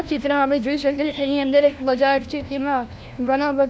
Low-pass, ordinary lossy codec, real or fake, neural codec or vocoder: none; none; fake; codec, 16 kHz, 1 kbps, FunCodec, trained on LibriTTS, 50 frames a second